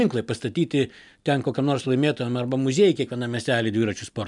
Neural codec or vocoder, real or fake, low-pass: none; real; 10.8 kHz